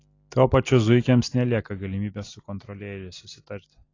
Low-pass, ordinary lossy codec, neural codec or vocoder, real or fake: 7.2 kHz; AAC, 32 kbps; none; real